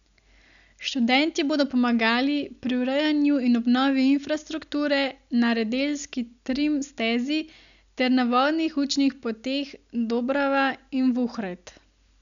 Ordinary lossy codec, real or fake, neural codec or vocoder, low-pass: MP3, 96 kbps; real; none; 7.2 kHz